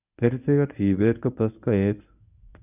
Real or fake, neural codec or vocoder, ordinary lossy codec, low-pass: fake; codec, 24 kHz, 0.9 kbps, WavTokenizer, medium speech release version 1; none; 3.6 kHz